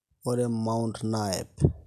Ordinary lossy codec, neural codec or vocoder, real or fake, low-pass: none; none; real; 14.4 kHz